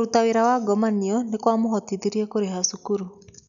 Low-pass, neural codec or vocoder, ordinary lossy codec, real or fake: 7.2 kHz; none; none; real